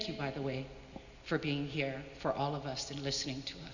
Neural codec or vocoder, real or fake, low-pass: none; real; 7.2 kHz